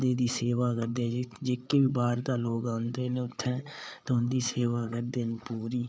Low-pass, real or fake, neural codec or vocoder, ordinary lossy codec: none; fake; codec, 16 kHz, 8 kbps, FreqCodec, larger model; none